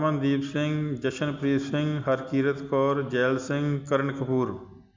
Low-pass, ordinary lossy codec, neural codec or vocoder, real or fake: 7.2 kHz; MP3, 64 kbps; none; real